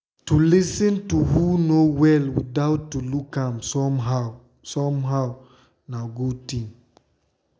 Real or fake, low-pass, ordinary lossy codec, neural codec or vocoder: real; none; none; none